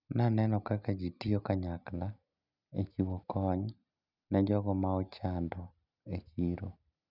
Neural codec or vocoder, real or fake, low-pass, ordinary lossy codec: none; real; 5.4 kHz; none